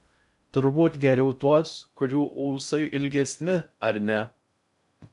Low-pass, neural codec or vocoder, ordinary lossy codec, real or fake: 10.8 kHz; codec, 16 kHz in and 24 kHz out, 0.6 kbps, FocalCodec, streaming, 2048 codes; MP3, 96 kbps; fake